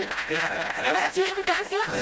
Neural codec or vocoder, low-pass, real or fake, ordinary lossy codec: codec, 16 kHz, 0.5 kbps, FreqCodec, smaller model; none; fake; none